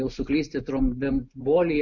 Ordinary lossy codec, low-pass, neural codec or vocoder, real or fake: MP3, 48 kbps; 7.2 kHz; none; real